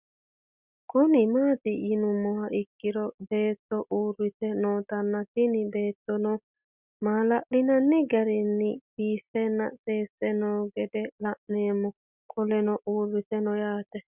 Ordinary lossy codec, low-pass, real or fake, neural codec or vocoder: Opus, 64 kbps; 3.6 kHz; real; none